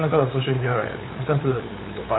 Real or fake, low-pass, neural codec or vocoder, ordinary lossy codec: fake; 7.2 kHz; codec, 16 kHz, 8 kbps, FunCodec, trained on LibriTTS, 25 frames a second; AAC, 16 kbps